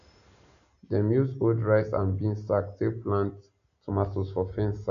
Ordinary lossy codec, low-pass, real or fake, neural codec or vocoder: none; 7.2 kHz; real; none